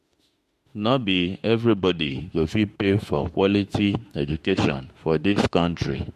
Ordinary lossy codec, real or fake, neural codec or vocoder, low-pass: MP3, 64 kbps; fake; autoencoder, 48 kHz, 32 numbers a frame, DAC-VAE, trained on Japanese speech; 14.4 kHz